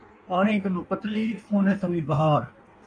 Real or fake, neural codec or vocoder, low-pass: fake; codec, 16 kHz in and 24 kHz out, 1.1 kbps, FireRedTTS-2 codec; 9.9 kHz